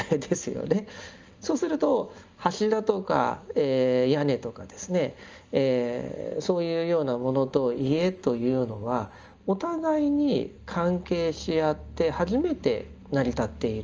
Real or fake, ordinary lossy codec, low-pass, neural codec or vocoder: real; Opus, 24 kbps; 7.2 kHz; none